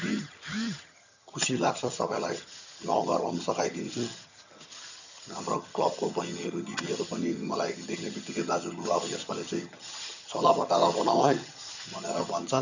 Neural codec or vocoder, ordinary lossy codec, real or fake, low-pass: vocoder, 22.05 kHz, 80 mel bands, HiFi-GAN; none; fake; 7.2 kHz